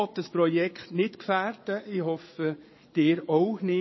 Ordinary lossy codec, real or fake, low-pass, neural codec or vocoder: MP3, 24 kbps; fake; 7.2 kHz; vocoder, 22.05 kHz, 80 mel bands, Vocos